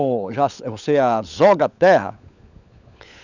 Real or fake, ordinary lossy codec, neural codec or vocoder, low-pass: fake; none; codec, 16 kHz, 16 kbps, FunCodec, trained on LibriTTS, 50 frames a second; 7.2 kHz